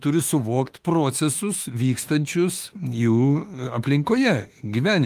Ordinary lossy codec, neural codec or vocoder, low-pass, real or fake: Opus, 32 kbps; autoencoder, 48 kHz, 32 numbers a frame, DAC-VAE, trained on Japanese speech; 14.4 kHz; fake